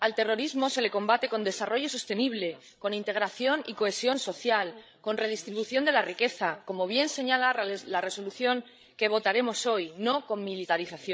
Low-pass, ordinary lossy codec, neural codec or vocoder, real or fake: none; none; codec, 16 kHz, 16 kbps, FreqCodec, larger model; fake